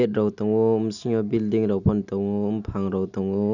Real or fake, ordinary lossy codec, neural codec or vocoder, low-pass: real; none; none; 7.2 kHz